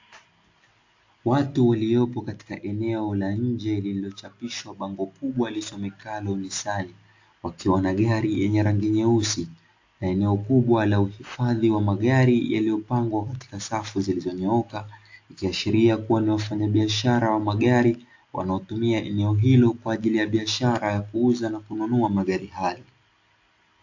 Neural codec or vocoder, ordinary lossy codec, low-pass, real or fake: none; AAC, 48 kbps; 7.2 kHz; real